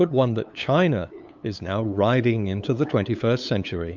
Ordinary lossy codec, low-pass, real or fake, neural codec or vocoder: MP3, 64 kbps; 7.2 kHz; fake; codec, 16 kHz, 8 kbps, FunCodec, trained on LibriTTS, 25 frames a second